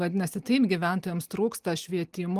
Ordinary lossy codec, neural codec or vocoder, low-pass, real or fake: Opus, 24 kbps; none; 14.4 kHz; real